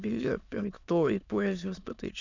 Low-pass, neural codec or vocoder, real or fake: 7.2 kHz; autoencoder, 22.05 kHz, a latent of 192 numbers a frame, VITS, trained on many speakers; fake